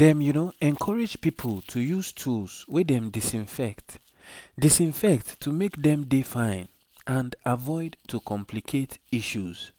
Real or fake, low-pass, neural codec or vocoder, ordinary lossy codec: real; none; none; none